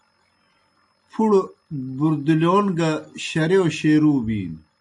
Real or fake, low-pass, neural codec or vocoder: real; 10.8 kHz; none